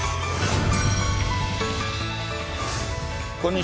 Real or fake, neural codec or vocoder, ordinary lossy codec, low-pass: real; none; none; none